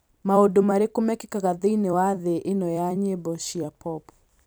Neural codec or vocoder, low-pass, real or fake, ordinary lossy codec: vocoder, 44.1 kHz, 128 mel bands every 256 samples, BigVGAN v2; none; fake; none